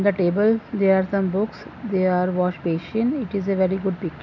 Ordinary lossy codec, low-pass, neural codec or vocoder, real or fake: none; 7.2 kHz; none; real